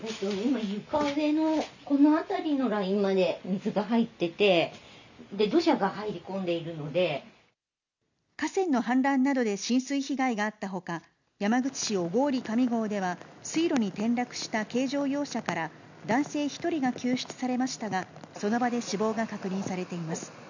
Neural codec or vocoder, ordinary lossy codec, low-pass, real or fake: none; none; 7.2 kHz; real